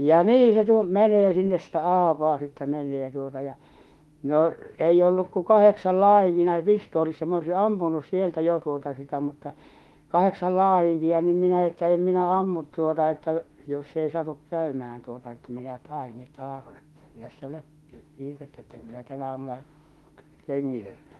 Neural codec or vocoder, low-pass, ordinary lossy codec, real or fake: autoencoder, 48 kHz, 32 numbers a frame, DAC-VAE, trained on Japanese speech; 19.8 kHz; Opus, 24 kbps; fake